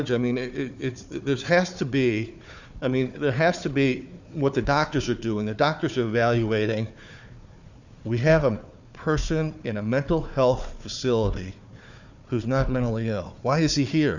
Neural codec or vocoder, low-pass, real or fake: codec, 16 kHz, 4 kbps, FunCodec, trained on Chinese and English, 50 frames a second; 7.2 kHz; fake